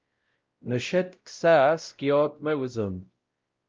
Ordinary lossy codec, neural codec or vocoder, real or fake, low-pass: Opus, 24 kbps; codec, 16 kHz, 0.5 kbps, X-Codec, WavLM features, trained on Multilingual LibriSpeech; fake; 7.2 kHz